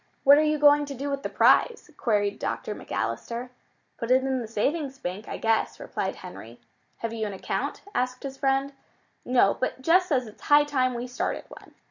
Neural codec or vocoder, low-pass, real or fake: none; 7.2 kHz; real